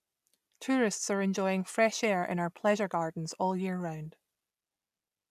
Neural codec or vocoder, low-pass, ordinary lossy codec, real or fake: none; 14.4 kHz; none; real